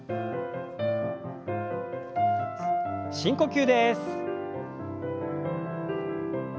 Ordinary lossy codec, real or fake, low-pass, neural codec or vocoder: none; real; none; none